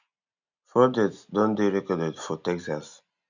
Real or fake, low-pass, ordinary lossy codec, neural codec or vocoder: real; 7.2 kHz; none; none